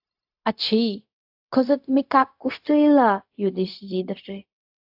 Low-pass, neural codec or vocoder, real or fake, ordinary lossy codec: 5.4 kHz; codec, 16 kHz, 0.4 kbps, LongCat-Audio-Codec; fake; AAC, 48 kbps